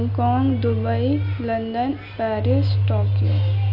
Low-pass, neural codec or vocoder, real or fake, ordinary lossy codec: 5.4 kHz; none; real; AAC, 48 kbps